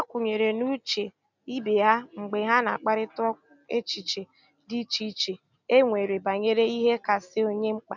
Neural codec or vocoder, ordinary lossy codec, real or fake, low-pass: none; none; real; 7.2 kHz